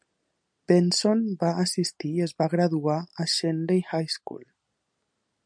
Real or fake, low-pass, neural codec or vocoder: real; 9.9 kHz; none